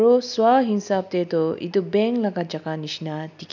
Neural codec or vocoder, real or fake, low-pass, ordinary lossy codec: none; real; 7.2 kHz; none